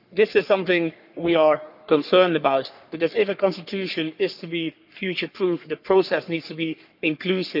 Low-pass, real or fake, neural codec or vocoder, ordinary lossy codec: 5.4 kHz; fake; codec, 44.1 kHz, 3.4 kbps, Pupu-Codec; none